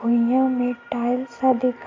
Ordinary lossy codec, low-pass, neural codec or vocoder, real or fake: AAC, 32 kbps; 7.2 kHz; none; real